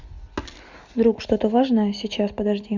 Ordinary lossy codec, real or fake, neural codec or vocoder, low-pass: Opus, 64 kbps; real; none; 7.2 kHz